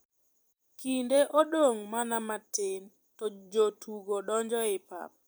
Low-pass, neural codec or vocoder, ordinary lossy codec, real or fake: none; vocoder, 44.1 kHz, 128 mel bands every 256 samples, BigVGAN v2; none; fake